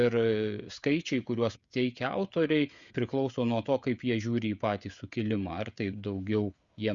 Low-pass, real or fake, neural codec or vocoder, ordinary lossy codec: 7.2 kHz; fake; codec, 16 kHz, 16 kbps, FreqCodec, smaller model; Opus, 64 kbps